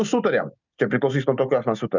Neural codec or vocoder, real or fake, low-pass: none; real; 7.2 kHz